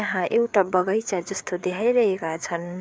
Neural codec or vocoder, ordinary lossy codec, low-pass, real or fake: codec, 16 kHz, 4 kbps, FreqCodec, larger model; none; none; fake